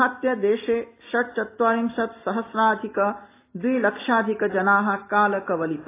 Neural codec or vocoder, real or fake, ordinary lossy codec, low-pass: none; real; AAC, 24 kbps; 3.6 kHz